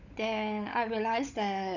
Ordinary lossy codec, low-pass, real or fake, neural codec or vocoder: none; 7.2 kHz; fake; codec, 16 kHz, 16 kbps, FunCodec, trained on LibriTTS, 50 frames a second